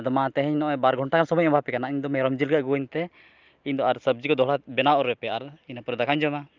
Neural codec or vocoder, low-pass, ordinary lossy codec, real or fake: none; 7.2 kHz; Opus, 24 kbps; real